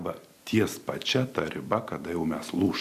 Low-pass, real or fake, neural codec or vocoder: 14.4 kHz; fake; vocoder, 44.1 kHz, 128 mel bands every 256 samples, BigVGAN v2